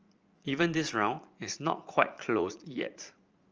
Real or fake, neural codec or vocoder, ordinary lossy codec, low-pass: fake; vocoder, 44.1 kHz, 80 mel bands, Vocos; Opus, 24 kbps; 7.2 kHz